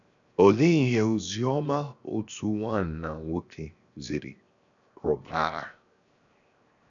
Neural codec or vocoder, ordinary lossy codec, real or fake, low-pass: codec, 16 kHz, 0.7 kbps, FocalCodec; none; fake; 7.2 kHz